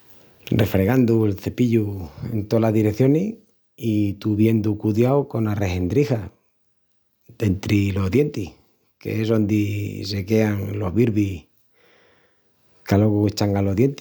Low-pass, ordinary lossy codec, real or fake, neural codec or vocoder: none; none; real; none